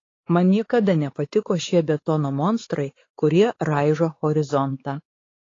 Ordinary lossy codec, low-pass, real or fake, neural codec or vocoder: AAC, 32 kbps; 7.2 kHz; fake; codec, 16 kHz, 4 kbps, X-Codec, WavLM features, trained on Multilingual LibriSpeech